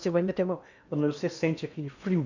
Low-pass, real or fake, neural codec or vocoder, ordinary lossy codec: 7.2 kHz; fake; codec, 16 kHz in and 24 kHz out, 0.8 kbps, FocalCodec, streaming, 65536 codes; none